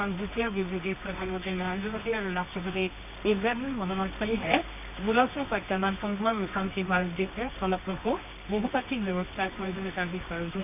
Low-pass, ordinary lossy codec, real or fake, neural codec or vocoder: 3.6 kHz; none; fake; codec, 24 kHz, 0.9 kbps, WavTokenizer, medium music audio release